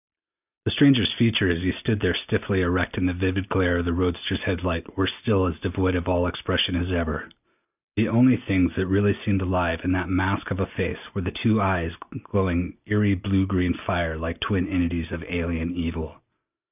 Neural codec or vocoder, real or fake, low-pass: none; real; 3.6 kHz